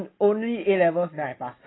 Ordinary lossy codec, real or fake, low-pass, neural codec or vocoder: AAC, 16 kbps; real; 7.2 kHz; none